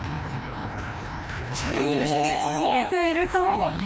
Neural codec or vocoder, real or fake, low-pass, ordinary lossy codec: codec, 16 kHz, 1 kbps, FreqCodec, larger model; fake; none; none